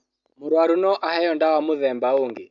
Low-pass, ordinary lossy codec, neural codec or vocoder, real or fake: 7.2 kHz; none; none; real